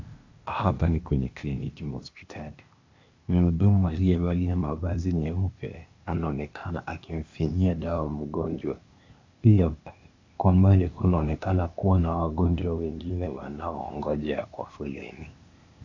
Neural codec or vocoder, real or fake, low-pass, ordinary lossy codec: codec, 16 kHz, 0.8 kbps, ZipCodec; fake; 7.2 kHz; AAC, 48 kbps